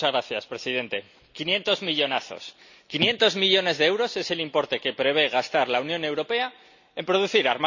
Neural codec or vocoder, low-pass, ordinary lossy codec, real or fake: none; 7.2 kHz; none; real